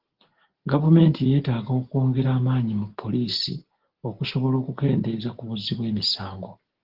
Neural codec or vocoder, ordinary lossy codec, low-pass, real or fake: none; Opus, 16 kbps; 5.4 kHz; real